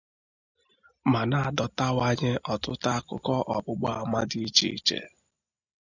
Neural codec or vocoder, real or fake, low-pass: none; real; 7.2 kHz